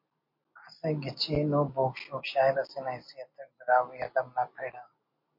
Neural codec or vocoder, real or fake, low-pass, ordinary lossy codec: none; real; 5.4 kHz; MP3, 32 kbps